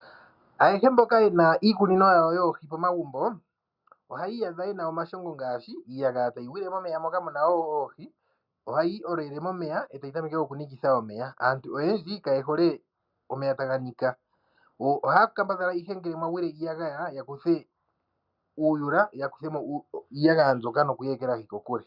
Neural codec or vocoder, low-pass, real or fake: none; 5.4 kHz; real